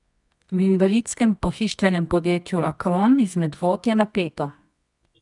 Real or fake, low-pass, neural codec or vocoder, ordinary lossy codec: fake; 10.8 kHz; codec, 24 kHz, 0.9 kbps, WavTokenizer, medium music audio release; none